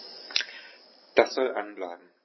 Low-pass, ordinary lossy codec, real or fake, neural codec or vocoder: 7.2 kHz; MP3, 24 kbps; real; none